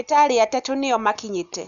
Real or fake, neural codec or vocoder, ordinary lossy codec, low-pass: real; none; none; 7.2 kHz